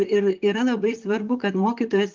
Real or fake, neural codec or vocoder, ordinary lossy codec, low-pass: fake; vocoder, 44.1 kHz, 128 mel bands, Pupu-Vocoder; Opus, 32 kbps; 7.2 kHz